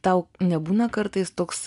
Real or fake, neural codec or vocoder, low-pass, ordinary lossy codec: real; none; 10.8 kHz; AAC, 96 kbps